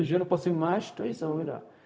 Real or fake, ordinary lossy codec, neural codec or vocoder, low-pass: fake; none; codec, 16 kHz, 0.4 kbps, LongCat-Audio-Codec; none